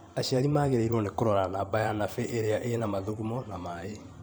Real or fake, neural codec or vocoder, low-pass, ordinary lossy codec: fake; vocoder, 44.1 kHz, 128 mel bands every 512 samples, BigVGAN v2; none; none